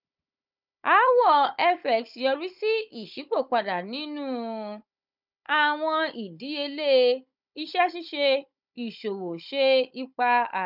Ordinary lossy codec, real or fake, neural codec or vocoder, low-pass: none; fake; codec, 16 kHz, 16 kbps, FunCodec, trained on Chinese and English, 50 frames a second; 5.4 kHz